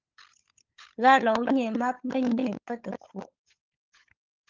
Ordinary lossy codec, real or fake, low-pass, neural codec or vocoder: Opus, 32 kbps; fake; 7.2 kHz; codec, 16 kHz, 16 kbps, FunCodec, trained on LibriTTS, 50 frames a second